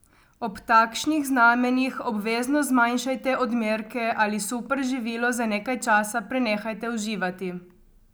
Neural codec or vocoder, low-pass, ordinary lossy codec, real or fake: none; none; none; real